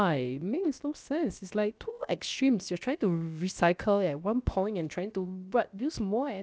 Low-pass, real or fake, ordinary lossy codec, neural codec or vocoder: none; fake; none; codec, 16 kHz, about 1 kbps, DyCAST, with the encoder's durations